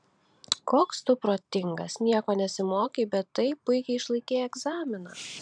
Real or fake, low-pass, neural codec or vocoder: fake; 9.9 kHz; vocoder, 44.1 kHz, 128 mel bands every 512 samples, BigVGAN v2